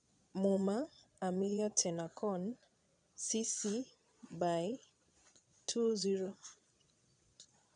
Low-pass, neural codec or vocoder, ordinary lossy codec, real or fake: 9.9 kHz; vocoder, 22.05 kHz, 80 mel bands, Vocos; none; fake